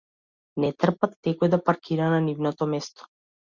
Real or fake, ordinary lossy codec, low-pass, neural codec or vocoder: real; Opus, 64 kbps; 7.2 kHz; none